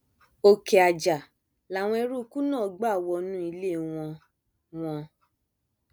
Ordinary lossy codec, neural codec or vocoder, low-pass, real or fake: none; none; none; real